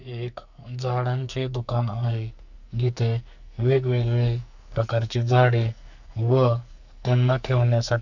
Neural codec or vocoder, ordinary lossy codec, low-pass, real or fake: codec, 44.1 kHz, 2.6 kbps, SNAC; none; 7.2 kHz; fake